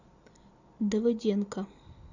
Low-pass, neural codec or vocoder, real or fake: 7.2 kHz; none; real